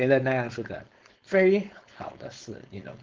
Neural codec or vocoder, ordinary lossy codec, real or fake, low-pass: codec, 16 kHz, 4.8 kbps, FACodec; Opus, 16 kbps; fake; 7.2 kHz